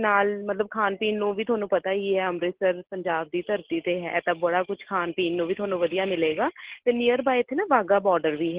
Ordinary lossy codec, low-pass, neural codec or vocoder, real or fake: Opus, 16 kbps; 3.6 kHz; none; real